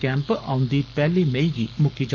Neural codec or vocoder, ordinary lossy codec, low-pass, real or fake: codec, 44.1 kHz, 7.8 kbps, Pupu-Codec; none; 7.2 kHz; fake